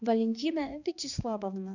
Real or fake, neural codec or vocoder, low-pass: fake; codec, 16 kHz, 2 kbps, X-Codec, HuBERT features, trained on balanced general audio; 7.2 kHz